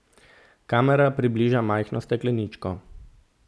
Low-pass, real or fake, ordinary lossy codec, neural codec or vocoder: none; real; none; none